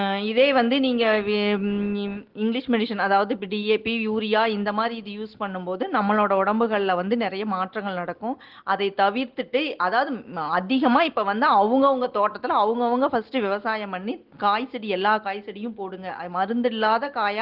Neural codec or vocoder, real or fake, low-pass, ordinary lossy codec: none; real; 5.4 kHz; Opus, 16 kbps